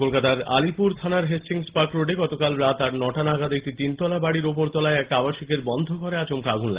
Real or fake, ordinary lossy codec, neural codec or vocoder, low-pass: real; Opus, 16 kbps; none; 3.6 kHz